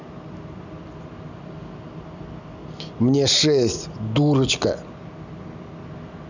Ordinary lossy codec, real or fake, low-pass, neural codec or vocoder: none; real; 7.2 kHz; none